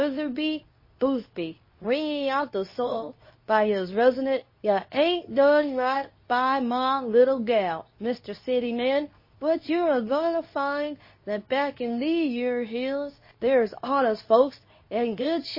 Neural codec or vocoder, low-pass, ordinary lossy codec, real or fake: codec, 24 kHz, 0.9 kbps, WavTokenizer, medium speech release version 2; 5.4 kHz; MP3, 24 kbps; fake